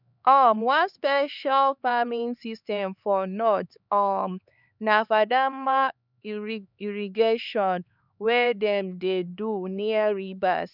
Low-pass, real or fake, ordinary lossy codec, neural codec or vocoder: 5.4 kHz; fake; none; codec, 16 kHz, 4 kbps, X-Codec, HuBERT features, trained on LibriSpeech